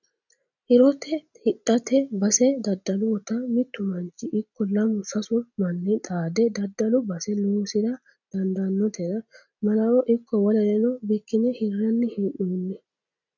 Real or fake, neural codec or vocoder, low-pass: real; none; 7.2 kHz